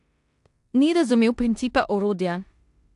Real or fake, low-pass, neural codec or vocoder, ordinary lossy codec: fake; 10.8 kHz; codec, 16 kHz in and 24 kHz out, 0.9 kbps, LongCat-Audio-Codec, four codebook decoder; AAC, 64 kbps